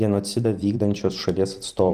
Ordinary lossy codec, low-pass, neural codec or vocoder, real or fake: Opus, 24 kbps; 14.4 kHz; vocoder, 44.1 kHz, 128 mel bands every 256 samples, BigVGAN v2; fake